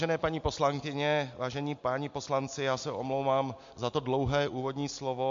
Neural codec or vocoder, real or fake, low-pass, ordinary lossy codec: none; real; 7.2 kHz; MP3, 48 kbps